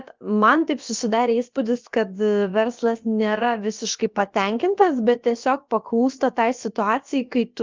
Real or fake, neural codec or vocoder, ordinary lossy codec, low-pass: fake; codec, 16 kHz, about 1 kbps, DyCAST, with the encoder's durations; Opus, 24 kbps; 7.2 kHz